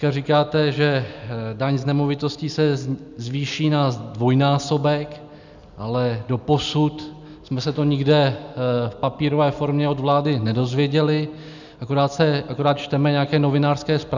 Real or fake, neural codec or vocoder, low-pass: real; none; 7.2 kHz